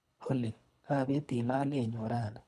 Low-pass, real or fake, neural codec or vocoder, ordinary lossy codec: none; fake; codec, 24 kHz, 3 kbps, HILCodec; none